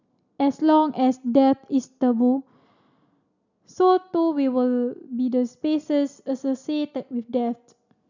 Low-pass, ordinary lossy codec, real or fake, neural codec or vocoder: 7.2 kHz; none; real; none